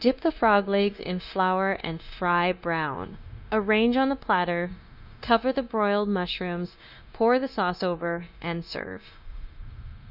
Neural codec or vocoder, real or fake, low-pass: codec, 16 kHz, 0.9 kbps, LongCat-Audio-Codec; fake; 5.4 kHz